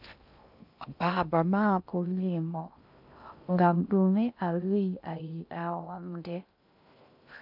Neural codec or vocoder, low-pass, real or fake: codec, 16 kHz in and 24 kHz out, 0.6 kbps, FocalCodec, streaming, 2048 codes; 5.4 kHz; fake